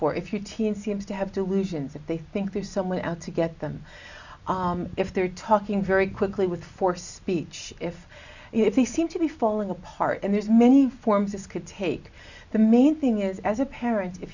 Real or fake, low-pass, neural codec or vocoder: real; 7.2 kHz; none